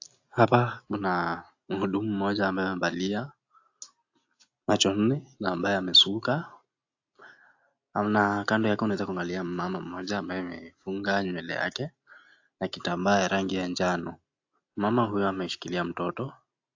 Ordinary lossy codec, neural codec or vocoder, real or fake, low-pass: AAC, 48 kbps; none; real; 7.2 kHz